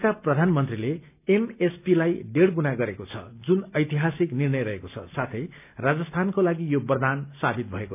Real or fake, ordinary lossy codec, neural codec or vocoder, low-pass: real; none; none; 3.6 kHz